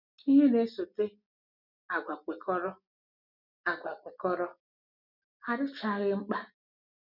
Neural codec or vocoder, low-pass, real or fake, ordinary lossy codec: none; 5.4 kHz; real; none